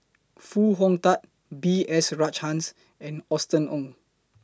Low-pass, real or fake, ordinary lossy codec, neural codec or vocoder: none; real; none; none